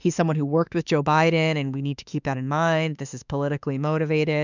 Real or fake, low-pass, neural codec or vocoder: fake; 7.2 kHz; autoencoder, 48 kHz, 32 numbers a frame, DAC-VAE, trained on Japanese speech